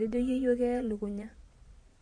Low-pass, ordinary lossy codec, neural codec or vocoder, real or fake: 9.9 kHz; MP3, 48 kbps; vocoder, 22.05 kHz, 80 mel bands, Vocos; fake